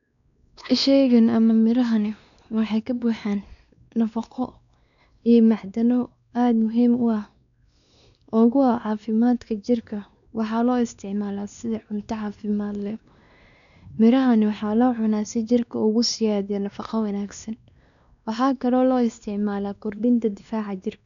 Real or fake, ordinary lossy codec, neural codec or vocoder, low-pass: fake; none; codec, 16 kHz, 2 kbps, X-Codec, WavLM features, trained on Multilingual LibriSpeech; 7.2 kHz